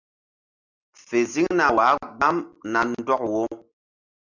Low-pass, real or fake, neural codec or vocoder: 7.2 kHz; real; none